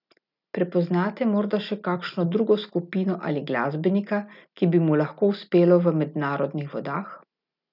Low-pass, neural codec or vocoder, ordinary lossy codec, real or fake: 5.4 kHz; none; none; real